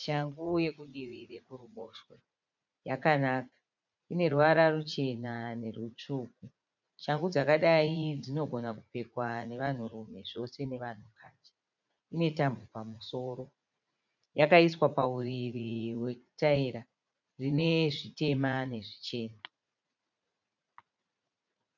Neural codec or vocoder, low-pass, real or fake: vocoder, 44.1 kHz, 80 mel bands, Vocos; 7.2 kHz; fake